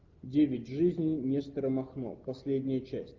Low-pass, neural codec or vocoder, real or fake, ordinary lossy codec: 7.2 kHz; none; real; Opus, 32 kbps